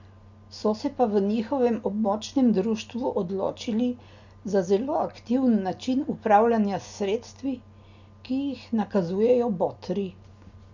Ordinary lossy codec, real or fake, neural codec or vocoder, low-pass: none; real; none; 7.2 kHz